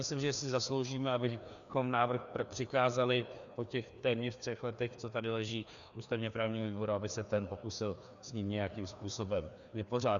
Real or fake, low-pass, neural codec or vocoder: fake; 7.2 kHz; codec, 16 kHz, 2 kbps, FreqCodec, larger model